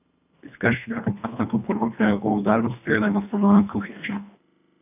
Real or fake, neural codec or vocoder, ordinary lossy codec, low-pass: fake; codec, 24 kHz, 1.5 kbps, HILCodec; none; 3.6 kHz